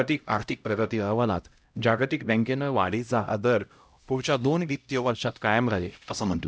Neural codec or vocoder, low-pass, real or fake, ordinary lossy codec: codec, 16 kHz, 0.5 kbps, X-Codec, HuBERT features, trained on LibriSpeech; none; fake; none